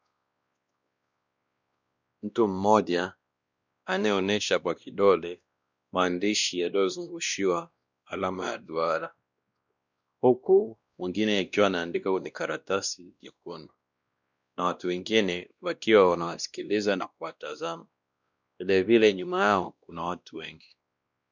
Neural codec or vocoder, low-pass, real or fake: codec, 16 kHz, 1 kbps, X-Codec, WavLM features, trained on Multilingual LibriSpeech; 7.2 kHz; fake